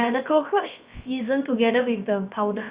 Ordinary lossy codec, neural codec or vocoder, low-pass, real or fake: Opus, 64 kbps; codec, 16 kHz, about 1 kbps, DyCAST, with the encoder's durations; 3.6 kHz; fake